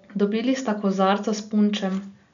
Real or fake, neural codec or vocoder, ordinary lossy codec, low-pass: real; none; none; 7.2 kHz